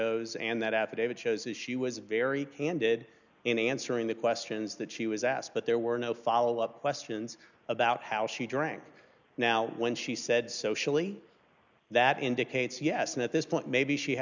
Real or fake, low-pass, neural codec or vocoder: real; 7.2 kHz; none